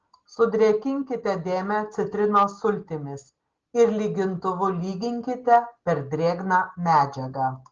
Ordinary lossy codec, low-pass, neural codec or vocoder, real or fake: Opus, 16 kbps; 7.2 kHz; none; real